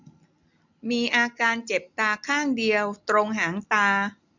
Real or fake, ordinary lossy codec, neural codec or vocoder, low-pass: real; none; none; 7.2 kHz